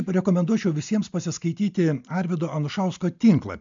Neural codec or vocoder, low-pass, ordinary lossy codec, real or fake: none; 7.2 kHz; MP3, 64 kbps; real